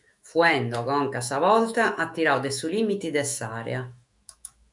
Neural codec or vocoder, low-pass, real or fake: autoencoder, 48 kHz, 128 numbers a frame, DAC-VAE, trained on Japanese speech; 10.8 kHz; fake